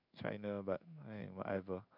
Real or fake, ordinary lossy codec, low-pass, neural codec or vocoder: fake; none; 5.4 kHz; codec, 16 kHz in and 24 kHz out, 1 kbps, XY-Tokenizer